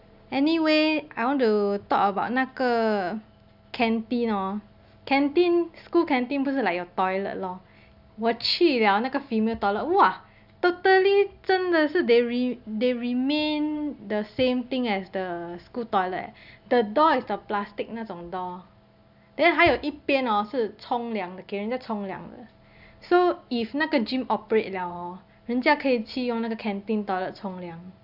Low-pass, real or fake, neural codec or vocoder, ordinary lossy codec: 5.4 kHz; real; none; none